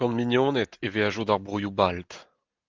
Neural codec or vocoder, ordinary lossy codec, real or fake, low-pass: none; Opus, 32 kbps; real; 7.2 kHz